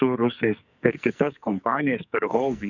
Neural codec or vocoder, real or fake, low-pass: codec, 44.1 kHz, 2.6 kbps, SNAC; fake; 7.2 kHz